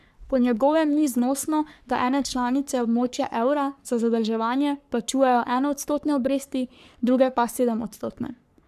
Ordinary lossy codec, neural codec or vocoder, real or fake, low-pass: none; codec, 44.1 kHz, 3.4 kbps, Pupu-Codec; fake; 14.4 kHz